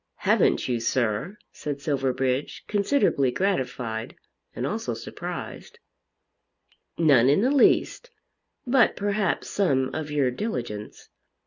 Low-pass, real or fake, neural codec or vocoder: 7.2 kHz; real; none